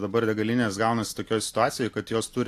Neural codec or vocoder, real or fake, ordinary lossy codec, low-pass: none; real; AAC, 64 kbps; 14.4 kHz